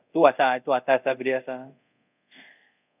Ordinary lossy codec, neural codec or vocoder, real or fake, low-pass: none; codec, 24 kHz, 0.5 kbps, DualCodec; fake; 3.6 kHz